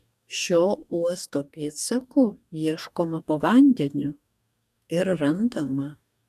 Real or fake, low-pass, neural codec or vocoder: fake; 14.4 kHz; codec, 44.1 kHz, 2.6 kbps, DAC